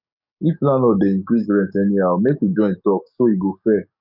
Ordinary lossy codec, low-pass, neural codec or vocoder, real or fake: none; 5.4 kHz; codec, 44.1 kHz, 7.8 kbps, DAC; fake